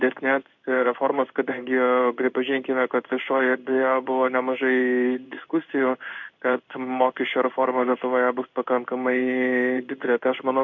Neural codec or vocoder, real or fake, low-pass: codec, 16 kHz in and 24 kHz out, 1 kbps, XY-Tokenizer; fake; 7.2 kHz